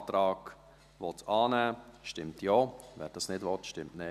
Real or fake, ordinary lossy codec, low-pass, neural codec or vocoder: real; none; 14.4 kHz; none